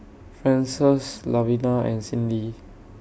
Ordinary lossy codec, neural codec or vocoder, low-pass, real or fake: none; none; none; real